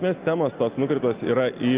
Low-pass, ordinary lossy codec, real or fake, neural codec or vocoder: 3.6 kHz; Opus, 32 kbps; real; none